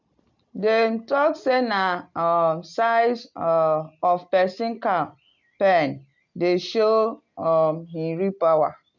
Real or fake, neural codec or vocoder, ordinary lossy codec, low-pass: real; none; none; 7.2 kHz